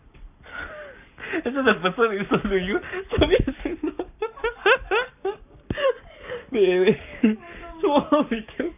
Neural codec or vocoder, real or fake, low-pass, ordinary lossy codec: codec, 44.1 kHz, 7.8 kbps, Pupu-Codec; fake; 3.6 kHz; none